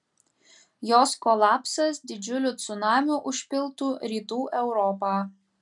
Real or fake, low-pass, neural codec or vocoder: real; 10.8 kHz; none